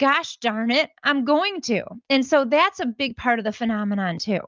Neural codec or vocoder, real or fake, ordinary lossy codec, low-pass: none; real; Opus, 24 kbps; 7.2 kHz